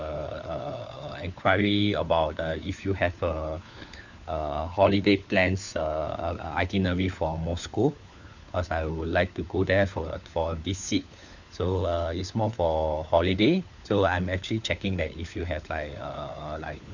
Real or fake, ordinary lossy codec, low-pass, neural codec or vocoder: fake; none; 7.2 kHz; codec, 16 kHz, 4 kbps, FunCodec, trained on LibriTTS, 50 frames a second